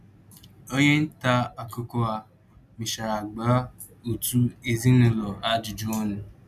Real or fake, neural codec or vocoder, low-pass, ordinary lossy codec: real; none; 14.4 kHz; none